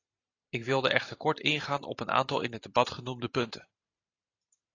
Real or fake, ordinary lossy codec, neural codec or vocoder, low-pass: fake; AAC, 32 kbps; vocoder, 44.1 kHz, 128 mel bands every 512 samples, BigVGAN v2; 7.2 kHz